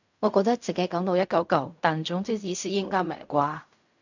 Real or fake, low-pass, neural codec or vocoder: fake; 7.2 kHz; codec, 16 kHz in and 24 kHz out, 0.4 kbps, LongCat-Audio-Codec, fine tuned four codebook decoder